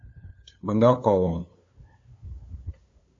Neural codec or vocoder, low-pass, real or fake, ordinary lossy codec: codec, 16 kHz, 2 kbps, FunCodec, trained on LibriTTS, 25 frames a second; 7.2 kHz; fake; AAC, 48 kbps